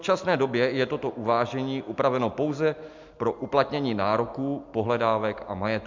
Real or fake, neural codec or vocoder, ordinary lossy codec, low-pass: real; none; MP3, 64 kbps; 7.2 kHz